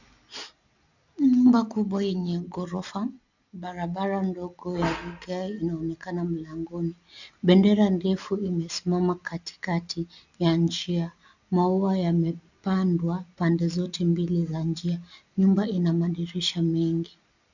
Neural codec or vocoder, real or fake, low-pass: none; real; 7.2 kHz